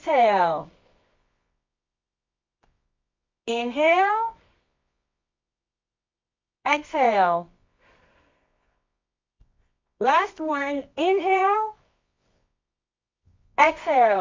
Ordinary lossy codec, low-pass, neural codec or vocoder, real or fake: MP3, 48 kbps; 7.2 kHz; codec, 24 kHz, 0.9 kbps, WavTokenizer, medium music audio release; fake